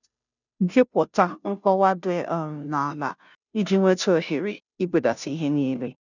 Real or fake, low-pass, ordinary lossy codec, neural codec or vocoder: fake; 7.2 kHz; none; codec, 16 kHz, 0.5 kbps, FunCodec, trained on Chinese and English, 25 frames a second